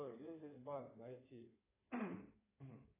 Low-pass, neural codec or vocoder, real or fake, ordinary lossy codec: 3.6 kHz; codec, 16 kHz in and 24 kHz out, 2.2 kbps, FireRedTTS-2 codec; fake; AAC, 16 kbps